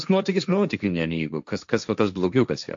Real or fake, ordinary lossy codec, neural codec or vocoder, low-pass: fake; AAC, 64 kbps; codec, 16 kHz, 1.1 kbps, Voila-Tokenizer; 7.2 kHz